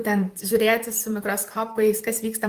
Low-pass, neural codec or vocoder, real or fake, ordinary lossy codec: 14.4 kHz; vocoder, 44.1 kHz, 128 mel bands, Pupu-Vocoder; fake; Opus, 32 kbps